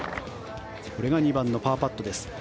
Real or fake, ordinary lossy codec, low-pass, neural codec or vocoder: real; none; none; none